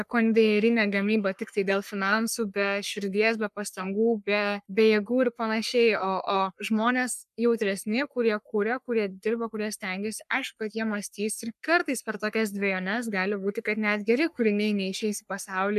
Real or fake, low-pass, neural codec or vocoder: fake; 14.4 kHz; codec, 44.1 kHz, 3.4 kbps, Pupu-Codec